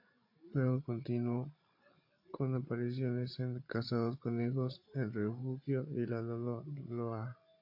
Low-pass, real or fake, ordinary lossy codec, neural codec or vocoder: 5.4 kHz; fake; AAC, 32 kbps; codec, 16 kHz, 16 kbps, FreqCodec, larger model